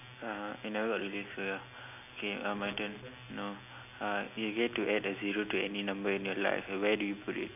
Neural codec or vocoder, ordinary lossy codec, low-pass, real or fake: none; none; 3.6 kHz; real